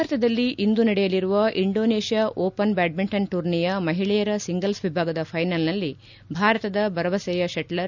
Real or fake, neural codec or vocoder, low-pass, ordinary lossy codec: real; none; 7.2 kHz; none